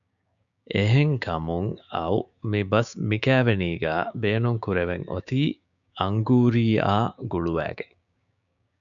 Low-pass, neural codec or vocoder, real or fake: 7.2 kHz; codec, 16 kHz, 6 kbps, DAC; fake